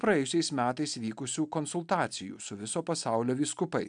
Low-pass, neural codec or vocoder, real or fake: 9.9 kHz; none; real